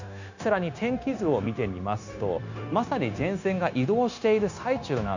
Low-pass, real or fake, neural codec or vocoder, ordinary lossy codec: 7.2 kHz; fake; codec, 16 kHz, 0.9 kbps, LongCat-Audio-Codec; none